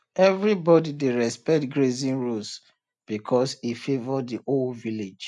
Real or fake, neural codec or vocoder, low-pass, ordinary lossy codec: real; none; 10.8 kHz; none